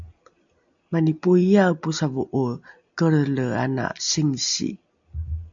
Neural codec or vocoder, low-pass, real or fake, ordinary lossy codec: none; 7.2 kHz; real; MP3, 64 kbps